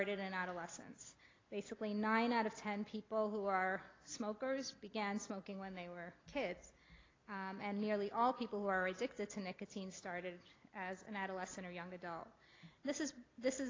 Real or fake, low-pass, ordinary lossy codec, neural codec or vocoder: real; 7.2 kHz; AAC, 32 kbps; none